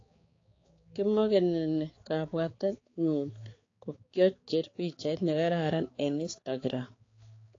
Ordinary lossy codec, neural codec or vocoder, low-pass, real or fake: AAC, 32 kbps; codec, 16 kHz, 4 kbps, X-Codec, HuBERT features, trained on balanced general audio; 7.2 kHz; fake